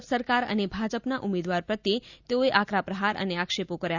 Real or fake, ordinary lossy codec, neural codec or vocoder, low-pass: real; Opus, 64 kbps; none; 7.2 kHz